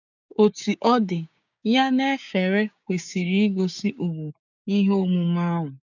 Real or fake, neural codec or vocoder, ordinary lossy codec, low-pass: fake; codec, 16 kHz, 6 kbps, DAC; none; 7.2 kHz